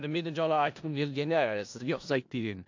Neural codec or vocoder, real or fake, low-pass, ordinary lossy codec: codec, 16 kHz in and 24 kHz out, 0.4 kbps, LongCat-Audio-Codec, four codebook decoder; fake; 7.2 kHz; AAC, 48 kbps